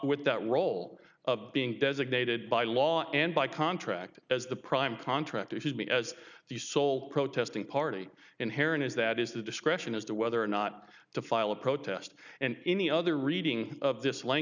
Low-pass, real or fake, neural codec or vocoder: 7.2 kHz; real; none